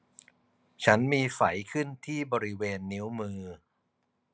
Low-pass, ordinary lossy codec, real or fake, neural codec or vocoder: none; none; real; none